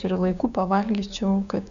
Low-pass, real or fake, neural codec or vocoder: 7.2 kHz; fake; codec, 16 kHz, 6 kbps, DAC